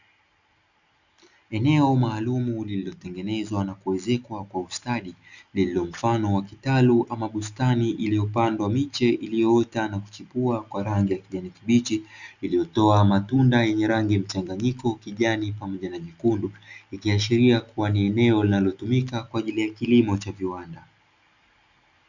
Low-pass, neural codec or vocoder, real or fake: 7.2 kHz; none; real